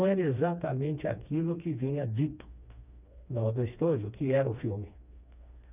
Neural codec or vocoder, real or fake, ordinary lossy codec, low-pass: codec, 16 kHz, 2 kbps, FreqCodec, smaller model; fake; none; 3.6 kHz